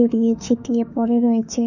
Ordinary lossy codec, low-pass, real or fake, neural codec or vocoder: none; 7.2 kHz; fake; autoencoder, 48 kHz, 32 numbers a frame, DAC-VAE, trained on Japanese speech